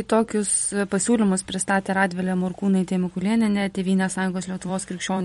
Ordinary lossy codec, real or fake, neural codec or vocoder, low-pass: MP3, 48 kbps; fake; vocoder, 44.1 kHz, 128 mel bands every 256 samples, BigVGAN v2; 19.8 kHz